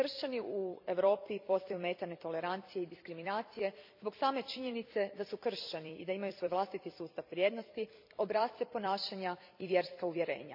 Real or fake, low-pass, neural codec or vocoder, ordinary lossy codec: fake; 5.4 kHz; vocoder, 44.1 kHz, 128 mel bands every 256 samples, BigVGAN v2; MP3, 48 kbps